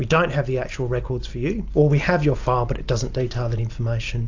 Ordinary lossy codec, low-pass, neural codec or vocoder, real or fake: AAC, 48 kbps; 7.2 kHz; none; real